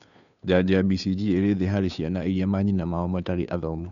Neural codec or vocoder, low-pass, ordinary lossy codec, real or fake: codec, 16 kHz, 2 kbps, FunCodec, trained on Chinese and English, 25 frames a second; 7.2 kHz; none; fake